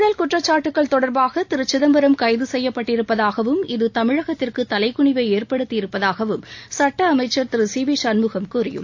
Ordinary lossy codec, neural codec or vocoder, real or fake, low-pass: AAC, 48 kbps; none; real; 7.2 kHz